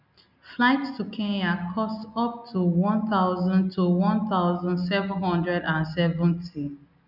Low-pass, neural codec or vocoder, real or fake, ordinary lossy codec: 5.4 kHz; none; real; none